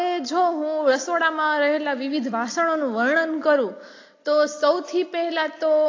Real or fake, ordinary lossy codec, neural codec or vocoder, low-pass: real; AAC, 32 kbps; none; 7.2 kHz